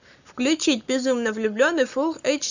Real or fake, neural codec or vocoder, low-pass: fake; codec, 44.1 kHz, 7.8 kbps, Pupu-Codec; 7.2 kHz